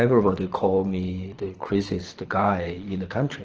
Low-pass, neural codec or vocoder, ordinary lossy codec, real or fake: 7.2 kHz; codec, 24 kHz, 6 kbps, HILCodec; Opus, 16 kbps; fake